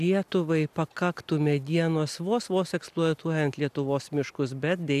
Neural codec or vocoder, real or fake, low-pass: none; real; 14.4 kHz